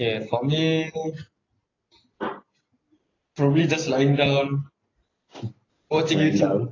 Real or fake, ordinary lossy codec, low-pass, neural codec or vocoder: real; AAC, 32 kbps; 7.2 kHz; none